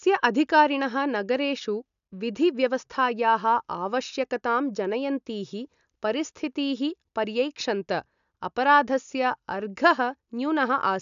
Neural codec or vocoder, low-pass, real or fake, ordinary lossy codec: none; 7.2 kHz; real; none